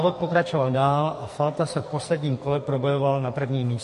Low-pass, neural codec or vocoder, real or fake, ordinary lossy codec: 14.4 kHz; codec, 44.1 kHz, 2.6 kbps, SNAC; fake; MP3, 48 kbps